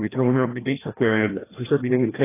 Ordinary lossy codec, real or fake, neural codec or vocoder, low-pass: AAC, 16 kbps; fake; codec, 16 kHz, 1 kbps, FreqCodec, larger model; 3.6 kHz